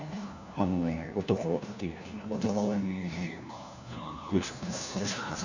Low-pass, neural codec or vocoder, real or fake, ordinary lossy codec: 7.2 kHz; codec, 16 kHz, 1 kbps, FunCodec, trained on LibriTTS, 50 frames a second; fake; none